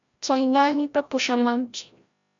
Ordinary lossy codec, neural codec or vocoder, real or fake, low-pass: MP3, 64 kbps; codec, 16 kHz, 0.5 kbps, FreqCodec, larger model; fake; 7.2 kHz